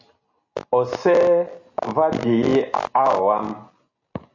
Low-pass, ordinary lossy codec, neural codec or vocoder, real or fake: 7.2 kHz; AAC, 48 kbps; none; real